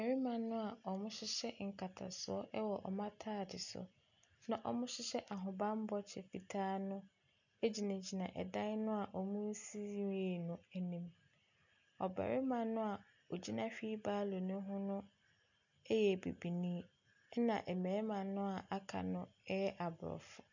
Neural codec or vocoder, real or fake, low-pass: none; real; 7.2 kHz